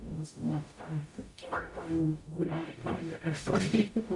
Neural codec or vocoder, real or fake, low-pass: codec, 44.1 kHz, 0.9 kbps, DAC; fake; 10.8 kHz